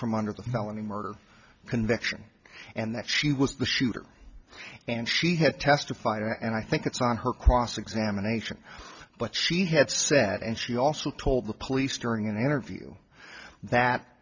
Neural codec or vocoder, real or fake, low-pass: none; real; 7.2 kHz